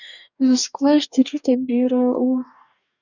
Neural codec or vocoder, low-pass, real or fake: codec, 44.1 kHz, 2.6 kbps, DAC; 7.2 kHz; fake